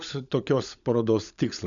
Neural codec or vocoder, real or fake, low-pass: codec, 16 kHz, 16 kbps, FunCodec, trained on LibriTTS, 50 frames a second; fake; 7.2 kHz